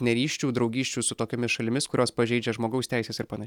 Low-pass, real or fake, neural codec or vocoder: 19.8 kHz; real; none